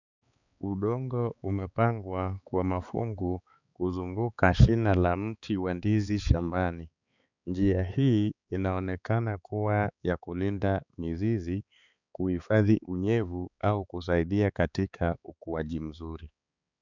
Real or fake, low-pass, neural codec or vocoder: fake; 7.2 kHz; codec, 16 kHz, 4 kbps, X-Codec, HuBERT features, trained on balanced general audio